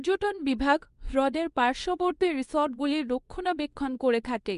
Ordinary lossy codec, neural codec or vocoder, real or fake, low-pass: Opus, 64 kbps; codec, 24 kHz, 0.9 kbps, WavTokenizer, medium speech release version 2; fake; 10.8 kHz